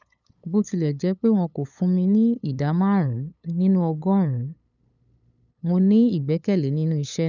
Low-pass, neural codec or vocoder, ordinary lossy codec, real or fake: 7.2 kHz; codec, 16 kHz, 8 kbps, FunCodec, trained on LibriTTS, 25 frames a second; none; fake